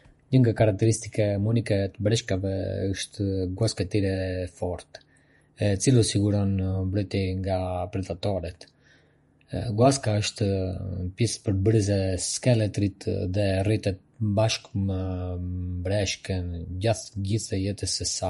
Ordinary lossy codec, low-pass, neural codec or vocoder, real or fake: MP3, 48 kbps; 19.8 kHz; vocoder, 48 kHz, 128 mel bands, Vocos; fake